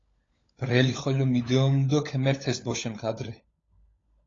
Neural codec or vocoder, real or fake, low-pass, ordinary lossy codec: codec, 16 kHz, 16 kbps, FunCodec, trained on LibriTTS, 50 frames a second; fake; 7.2 kHz; AAC, 32 kbps